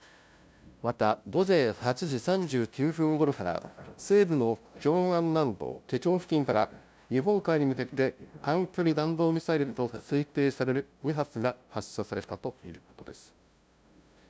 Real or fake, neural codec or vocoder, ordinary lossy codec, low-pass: fake; codec, 16 kHz, 0.5 kbps, FunCodec, trained on LibriTTS, 25 frames a second; none; none